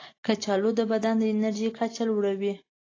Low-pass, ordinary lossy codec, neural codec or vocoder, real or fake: 7.2 kHz; AAC, 32 kbps; none; real